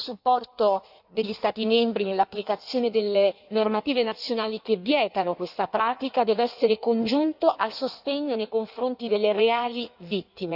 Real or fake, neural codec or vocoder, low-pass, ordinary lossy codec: fake; codec, 16 kHz in and 24 kHz out, 1.1 kbps, FireRedTTS-2 codec; 5.4 kHz; none